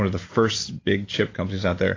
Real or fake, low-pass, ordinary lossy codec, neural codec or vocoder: real; 7.2 kHz; AAC, 32 kbps; none